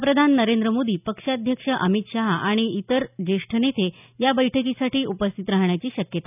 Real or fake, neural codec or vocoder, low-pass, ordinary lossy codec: real; none; 3.6 kHz; none